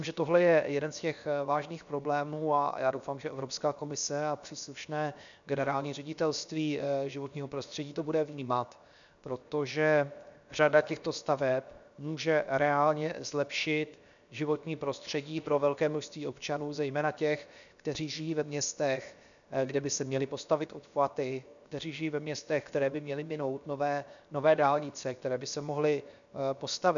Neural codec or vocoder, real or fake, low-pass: codec, 16 kHz, 0.7 kbps, FocalCodec; fake; 7.2 kHz